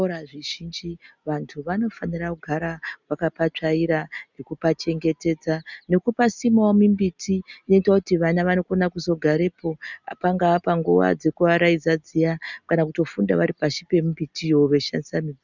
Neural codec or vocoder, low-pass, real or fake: none; 7.2 kHz; real